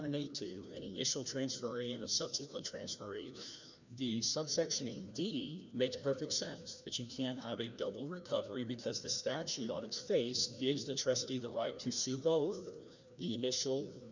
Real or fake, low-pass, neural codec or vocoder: fake; 7.2 kHz; codec, 16 kHz, 1 kbps, FreqCodec, larger model